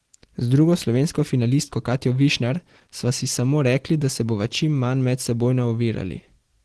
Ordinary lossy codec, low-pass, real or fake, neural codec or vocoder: Opus, 16 kbps; 10.8 kHz; real; none